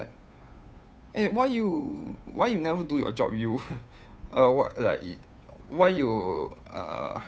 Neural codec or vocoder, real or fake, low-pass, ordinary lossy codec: codec, 16 kHz, 2 kbps, FunCodec, trained on Chinese and English, 25 frames a second; fake; none; none